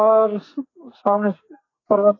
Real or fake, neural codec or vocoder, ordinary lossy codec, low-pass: fake; codec, 44.1 kHz, 2.6 kbps, SNAC; AAC, 32 kbps; 7.2 kHz